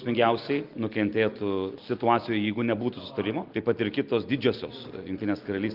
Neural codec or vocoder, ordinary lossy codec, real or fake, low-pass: none; Opus, 32 kbps; real; 5.4 kHz